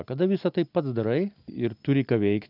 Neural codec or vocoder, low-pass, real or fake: none; 5.4 kHz; real